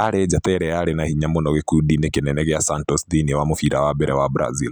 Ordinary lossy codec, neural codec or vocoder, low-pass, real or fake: none; none; none; real